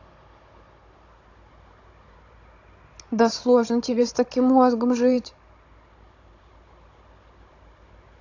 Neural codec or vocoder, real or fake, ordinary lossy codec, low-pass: codec, 16 kHz, 16 kbps, FunCodec, trained on Chinese and English, 50 frames a second; fake; AAC, 48 kbps; 7.2 kHz